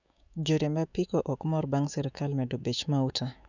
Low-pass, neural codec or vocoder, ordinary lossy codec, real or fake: 7.2 kHz; autoencoder, 48 kHz, 128 numbers a frame, DAC-VAE, trained on Japanese speech; none; fake